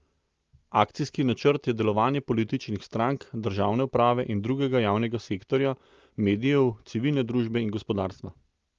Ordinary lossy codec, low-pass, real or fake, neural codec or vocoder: Opus, 32 kbps; 7.2 kHz; real; none